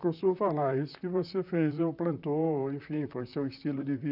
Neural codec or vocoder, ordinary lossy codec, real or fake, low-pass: vocoder, 44.1 kHz, 128 mel bands, Pupu-Vocoder; none; fake; 5.4 kHz